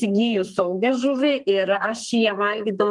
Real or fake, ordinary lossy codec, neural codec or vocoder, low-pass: fake; Opus, 32 kbps; codec, 32 kHz, 1.9 kbps, SNAC; 10.8 kHz